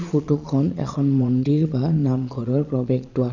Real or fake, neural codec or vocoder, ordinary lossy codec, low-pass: fake; vocoder, 22.05 kHz, 80 mel bands, Vocos; none; 7.2 kHz